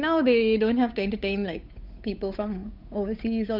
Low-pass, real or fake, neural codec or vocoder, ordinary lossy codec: 5.4 kHz; fake; codec, 16 kHz, 8 kbps, FunCodec, trained on Chinese and English, 25 frames a second; none